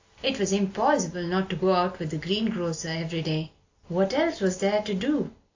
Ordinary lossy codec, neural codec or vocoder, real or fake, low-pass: AAC, 48 kbps; none; real; 7.2 kHz